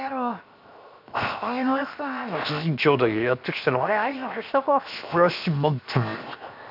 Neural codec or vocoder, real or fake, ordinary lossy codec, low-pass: codec, 16 kHz, 0.7 kbps, FocalCodec; fake; none; 5.4 kHz